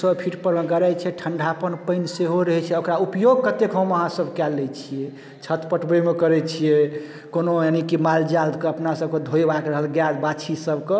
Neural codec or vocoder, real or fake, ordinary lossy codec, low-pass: none; real; none; none